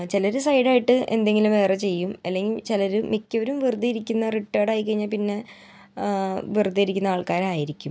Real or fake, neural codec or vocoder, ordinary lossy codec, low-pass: real; none; none; none